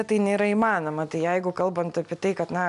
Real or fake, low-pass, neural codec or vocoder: real; 10.8 kHz; none